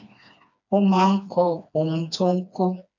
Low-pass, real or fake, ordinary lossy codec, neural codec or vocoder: 7.2 kHz; fake; none; codec, 16 kHz, 2 kbps, FreqCodec, smaller model